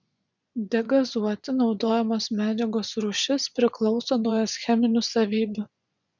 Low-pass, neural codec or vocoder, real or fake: 7.2 kHz; vocoder, 24 kHz, 100 mel bands, Vocos; fake